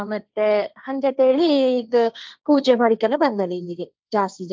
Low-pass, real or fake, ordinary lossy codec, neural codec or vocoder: none; fake; none; codec, 16 kHz, 1.1 kbps, Voila-Tokenizer